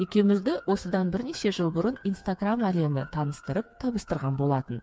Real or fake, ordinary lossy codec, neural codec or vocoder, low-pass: fake; none; codec, 16 kHz, 4 kbps, FreqCodec, smaller model; none